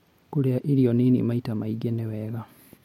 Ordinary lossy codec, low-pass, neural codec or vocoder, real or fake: MP3, 64 kbps; 19.8 kHz; none; real